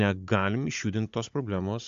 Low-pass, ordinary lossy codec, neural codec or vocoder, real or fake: 7.2 kHz; AAC, 64 kbps; codec, 16 kHz, 16 kbps, FunCodec, trained on Chinese and English, 50 frames a second; fake